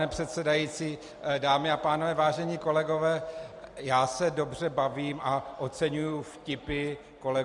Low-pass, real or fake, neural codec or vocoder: 10.8 kHz; real; none